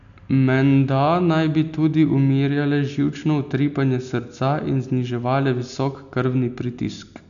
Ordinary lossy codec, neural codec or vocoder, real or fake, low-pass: MP3, 96 kbps; none; real; 7.2 kHz